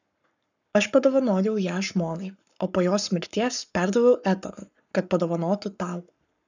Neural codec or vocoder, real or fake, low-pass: codec, 44.1 kHz, 7.8 kbps, Pupu-Codec; fake; 7.2 kHz